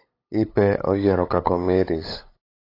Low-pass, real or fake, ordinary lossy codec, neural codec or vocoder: 5.4 kHz; fake; AAC, 32 kbps; codec, 16 kHz, 8 kbps, FunCodec, trained on LibriTTS, 25 frames a second